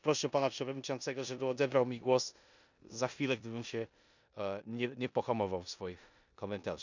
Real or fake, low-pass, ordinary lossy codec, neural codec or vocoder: fake; 7.2 kHz; none; codec, 16 kHz in and 24 kHz out, 0.9 kbps, LongCat-Audio-Codec, four codebook decoder